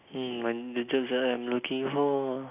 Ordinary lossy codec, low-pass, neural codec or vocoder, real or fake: none; 3.6 kHz; none; real